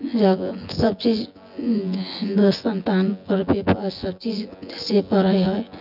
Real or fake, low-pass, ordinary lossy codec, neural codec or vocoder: fake; 5.4 kHz; none; vocoder, 24 kHz, 100 mel bands, Vocos